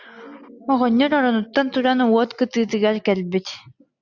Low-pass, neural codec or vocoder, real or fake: 7.2 kHz; none; real